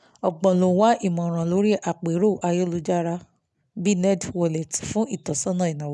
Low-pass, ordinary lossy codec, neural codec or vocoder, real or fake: none; none; none; real